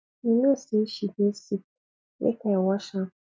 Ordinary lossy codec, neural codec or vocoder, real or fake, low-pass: none; none; real; none